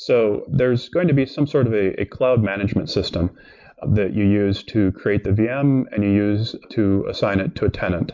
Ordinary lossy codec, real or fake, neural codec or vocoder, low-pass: MP3, 64 kbps; real; none; 7.2 kHz